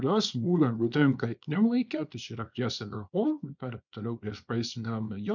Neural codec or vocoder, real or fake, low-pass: codec, 24 kHz, 0.9 kbps, WavTokenizer, small release; fake; 7.2 kHz